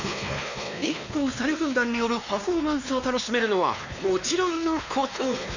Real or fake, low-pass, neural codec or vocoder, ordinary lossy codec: fake; 7.2 kHz; codec, 16 kHz, 2 kbps, X-Codec, WavLM features, trained on Multilingual LibriSpeech; none